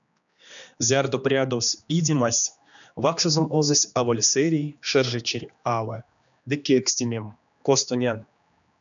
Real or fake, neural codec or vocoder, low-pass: fake; codec, 16 kHz, 2 kbps, X-Codec, HuBERT features, trained on general audio; 7.2 kHz